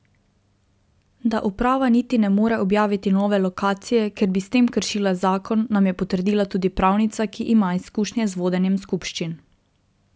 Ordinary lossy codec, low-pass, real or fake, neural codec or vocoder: none; none; real; none